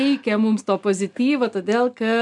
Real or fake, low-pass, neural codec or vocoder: real; 10.8 kHz; none